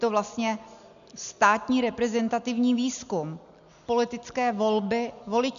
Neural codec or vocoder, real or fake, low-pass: none; real; 7.2 kHz